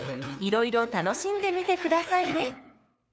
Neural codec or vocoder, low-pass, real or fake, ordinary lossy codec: codec, 16 kHz, 2 kbps, FunCodec, trained on LibriTTS, 25 frames a second; none; fake; none